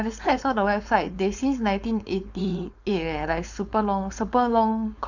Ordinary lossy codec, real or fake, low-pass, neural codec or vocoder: none; fake; 7.2 kHz; codec, 16 kHz, 4.8 kbps, FACodec